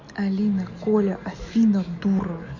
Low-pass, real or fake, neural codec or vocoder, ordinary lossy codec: 7.2 kHz; real; none; MP3, 48 kbps